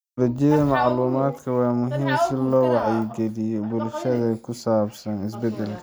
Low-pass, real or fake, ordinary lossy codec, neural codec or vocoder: none; real; none; none